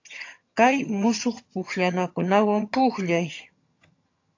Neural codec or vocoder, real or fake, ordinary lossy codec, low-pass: vocoder, 22.05 kHz, 80 mel bands, HiFi-GAN; fake; AAC, 48 kbps; 7.2 kHz